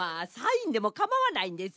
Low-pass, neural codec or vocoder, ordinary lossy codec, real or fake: none; none; none; real